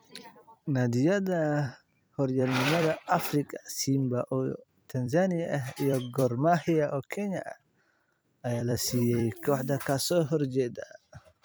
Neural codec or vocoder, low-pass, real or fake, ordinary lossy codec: vocoder, 44.1 kHz, 128 mel bands every 256 samples, BigVGAN v2; none; fake; none